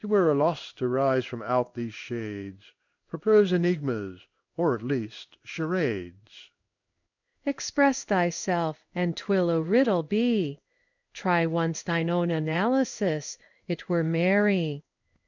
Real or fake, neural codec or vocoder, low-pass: fake; codec, 16 kHz in and 24 kHz out, 1 kbps, XY-Tokenizer; 7.2 kHz